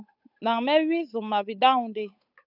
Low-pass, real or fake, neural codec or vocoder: 5.4 kHz; fake; codec, 16 kHz, 8 kbps, FunCodec, trained on Chinese and English, 25 frames a second